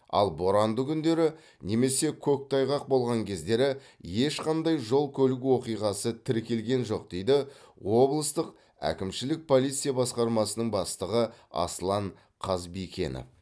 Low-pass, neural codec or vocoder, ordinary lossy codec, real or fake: none; none; none; real